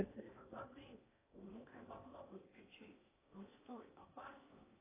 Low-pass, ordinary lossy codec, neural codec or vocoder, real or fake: 3.6 kHz; AAC, 16 kbps; codec, 16 kHz in and 24 kHz out, 0.8 kbps, FocalCodec, streaming, 65536 codes; fake